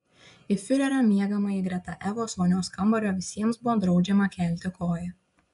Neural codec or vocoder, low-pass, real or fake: vocoder, 24 kHz, 100 mel bands, Vocos; 10.8 kHz; fake